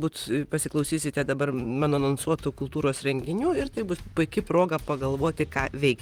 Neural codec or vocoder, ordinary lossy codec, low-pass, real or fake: vocoder, 44.1 kHz, 128 mel bands, Pupu-Vocoder; Opus, 24 kbps; 19.8 kHz; fake